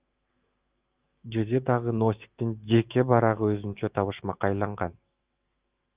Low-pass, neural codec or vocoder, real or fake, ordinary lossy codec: 3.6 kHz; autoencoder, 48 kHz, 128 numbers a frame, DAC-VAE, trained on Japanese speech; fake; Opus, 24 kbps